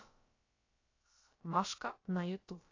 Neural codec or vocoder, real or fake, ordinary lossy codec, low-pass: codec, 16 kHz, about 1 kbps, DyCAST, with the encoder's durations; fake; AAC, 32 kbps; 7.2 kHz